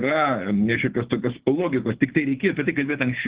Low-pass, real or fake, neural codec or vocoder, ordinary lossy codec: 3.6 kHz; fake; codec, 24 kHz, 6 kbps, HILCodec; Opus, 16 kbps